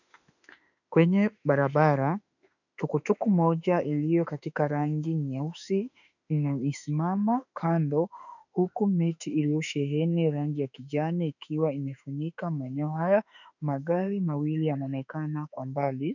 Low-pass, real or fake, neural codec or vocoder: 7.2 kHz; fake; autoencoder, 48 kHz, 32 numbers a frame, DAC-VAE, trained on Japanese speech